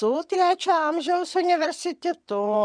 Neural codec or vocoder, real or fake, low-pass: vocoder, 22.05 kHz, 80 mel bands, WaveNeXt; fake; 9.9 kHz